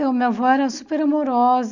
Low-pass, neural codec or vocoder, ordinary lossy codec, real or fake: 7.2 kHz; none; none; real